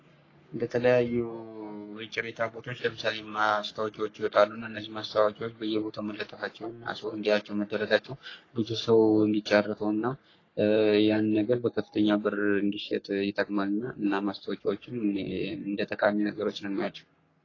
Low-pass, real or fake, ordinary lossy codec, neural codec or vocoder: 7.2 kHz; fake; AAC, 32 kbps; codec, 44.1 kHz, 3.4 kbps, Pupu-Codec